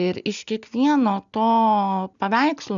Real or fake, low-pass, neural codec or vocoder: real; 7.2 kHz; none